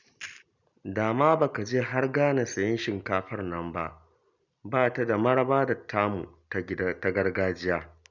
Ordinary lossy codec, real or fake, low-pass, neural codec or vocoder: none; real; 7.2 kHz; none